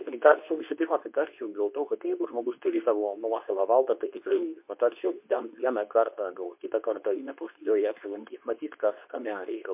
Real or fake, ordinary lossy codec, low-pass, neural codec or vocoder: fake; MP3, 32 kbps; 3.6 kHz; codec, 24 kHz, 0.9 kbps, WavTokenizer, medium speech release version 2